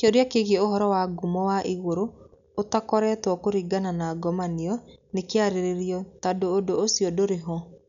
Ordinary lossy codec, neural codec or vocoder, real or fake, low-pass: none; none; real; 7.2 kHz